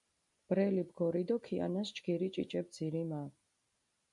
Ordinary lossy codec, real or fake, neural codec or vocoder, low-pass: MP3, 64 kbps; real; none; 10.8 kHz